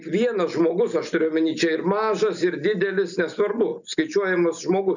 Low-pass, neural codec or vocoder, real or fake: 7.2 kHz; none; real